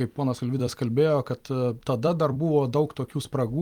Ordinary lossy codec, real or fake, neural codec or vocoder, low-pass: Opus, 32 kbps; fake; vocoder, 44.1 kHz, 128 mel bands every 256 samples, BigVGAN v2; 19.8 kHz